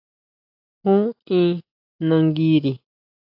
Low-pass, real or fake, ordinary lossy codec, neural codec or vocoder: 5.4 kHz; real; AAC, 48 kbps; none